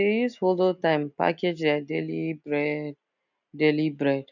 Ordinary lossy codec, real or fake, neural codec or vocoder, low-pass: none; real; none; 7.2 kHz